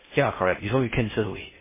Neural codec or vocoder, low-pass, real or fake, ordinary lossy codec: codec, 16 kHz in and 24 kHz out, 0.6 kbps, FocalCodec, streaming, 4096 codes; 3.6 kHz; fake; MP3, 16 kbps